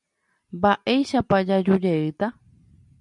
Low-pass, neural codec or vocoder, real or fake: 10.8 kHz; none; real